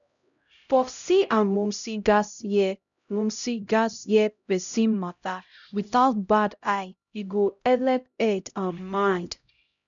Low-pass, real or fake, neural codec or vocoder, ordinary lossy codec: 7.2 kHz; fake; codec, 16 kHz, 0.5 kbps, X-Codec, HuBERT features, trained on LibriSpeech; none